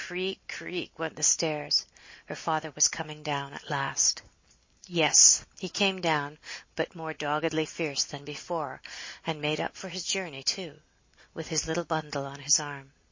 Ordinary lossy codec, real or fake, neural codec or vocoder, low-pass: MP3, 32 kbps; real; none; 7.2 kHz